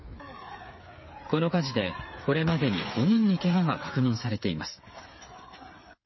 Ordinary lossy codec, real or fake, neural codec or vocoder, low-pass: MP3, 24 kbps; fake; codec, 16 kHz, 8 kbps, FreqCodec, smaller model; 7.2 kHz